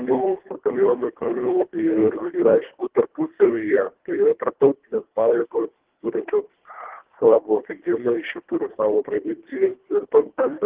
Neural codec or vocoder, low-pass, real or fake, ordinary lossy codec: codec, 24 kHz, 1.5 kbps, HILCodec; 3.6 kHz; fake; Opus, 16 kbps